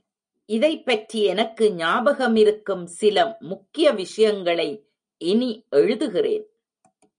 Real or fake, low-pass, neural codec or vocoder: real; 9.9 kHz; none